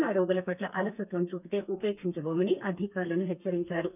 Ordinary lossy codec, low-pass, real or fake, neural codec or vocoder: none; 3.6 kHz; fake; codec, 32 kHz, 1.9 kbps, SNAC